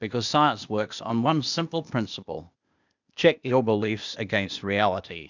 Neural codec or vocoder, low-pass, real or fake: codec, 16 kHz, 0.8 kbps, ZipCodec; 7.2 kHz; fake